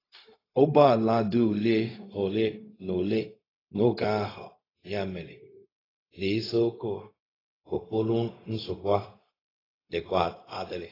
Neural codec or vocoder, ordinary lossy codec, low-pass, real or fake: codec, 16 kHz, 0.4 kbps, LongCat-Audio-Codec; AAC, 24 kbps; 5.4 kHz; fake